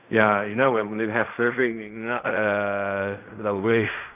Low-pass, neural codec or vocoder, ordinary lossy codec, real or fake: 3.6 kHz; codec, 16 kHz in and 24 kHz out, 0.4 kbps, LongCat-Audio-Codec, fine tuned four codebook decoder; none; fake